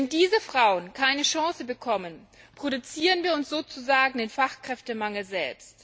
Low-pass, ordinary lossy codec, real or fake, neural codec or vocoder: none; none; real; none